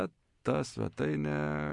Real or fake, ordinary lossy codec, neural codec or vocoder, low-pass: real; MP3, 64 kbps; none; 10.8 kHz